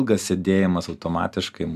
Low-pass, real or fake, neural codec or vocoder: 14.4 kHz; real; none